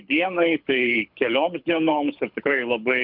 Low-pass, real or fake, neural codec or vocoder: 5.4 kHz; fake; codec, 24 kHz, 6 kbps, HILCodec